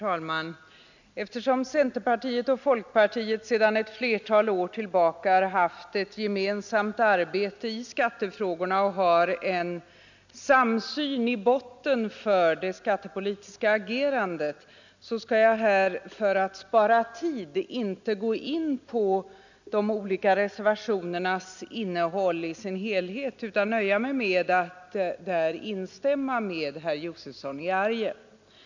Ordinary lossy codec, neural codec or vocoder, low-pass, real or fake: none; none; 7.2 kHz; real